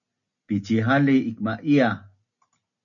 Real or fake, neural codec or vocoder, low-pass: real; none; 7.2 kHz